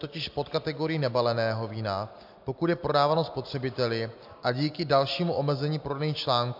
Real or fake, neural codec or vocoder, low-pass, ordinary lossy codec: real; none; 5.4 kHz; MP3, 48 kbps